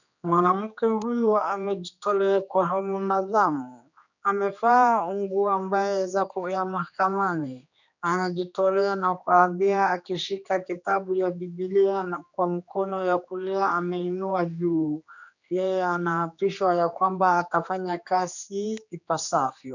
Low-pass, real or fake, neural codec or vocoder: 7.2 kHz; fake; codec, 16 kHz, 2 kbps, X-Codec, HuBERT features, trained on general audio